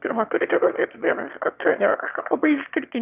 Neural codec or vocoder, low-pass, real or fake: autoencoder, 22.05 kHz, a latent of 192 numbers a frame, VITS, trained on one speaker; 3.6 kHz; fake